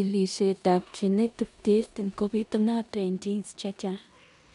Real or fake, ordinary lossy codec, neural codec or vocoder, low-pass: fake; none; codec, 16 kHz in and 24 kHz out, 0.9 kbps, LongCat-Audio-Codec, four codebook decoder; 10.8 kHz